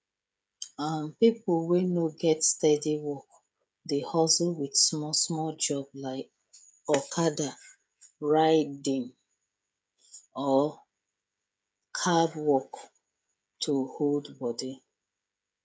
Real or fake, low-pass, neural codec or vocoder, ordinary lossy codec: fake; none; codec, 16 kHz, 16 kbps, FreqCodec, smaller model; none